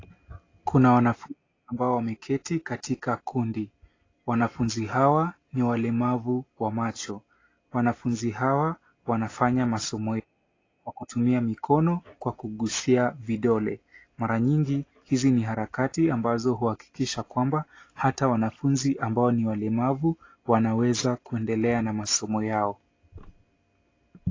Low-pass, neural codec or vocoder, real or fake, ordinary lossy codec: 7.2 kHz; none; real; AAC, 32 kbps